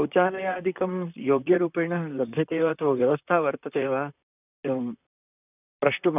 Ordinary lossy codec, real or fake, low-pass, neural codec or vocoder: none; fake; 3.6 kHz; vocoder, 44.1 kHz, 128 mel bands, Pupu-Vocoder